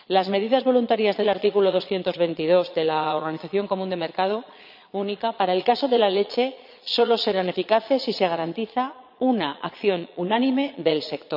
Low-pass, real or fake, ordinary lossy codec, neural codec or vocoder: 5.4 kHz; fake; none; vocoder, 22.05 kHz, 80 mel bands, Vocos